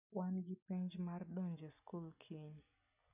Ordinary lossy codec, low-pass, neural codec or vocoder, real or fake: none; 3.6 kHz; none; real